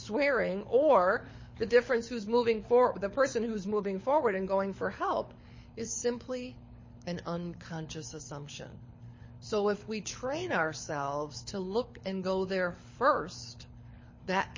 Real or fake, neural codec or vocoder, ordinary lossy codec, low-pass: fake; codec, 24 kHz, 6 kbps, HILCodec; MP3, 32 kbps; 7.2 kHz